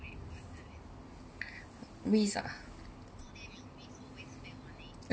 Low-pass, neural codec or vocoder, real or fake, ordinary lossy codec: none; none; real; none